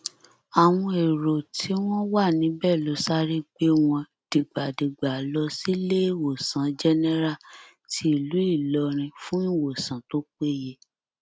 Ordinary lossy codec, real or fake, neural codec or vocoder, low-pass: none; real; none; none